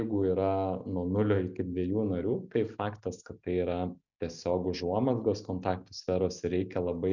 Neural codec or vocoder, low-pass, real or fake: none; 7.2 kHz; real